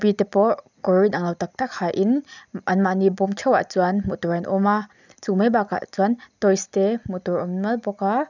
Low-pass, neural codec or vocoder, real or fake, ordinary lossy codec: 7.2 kHz; none; real; none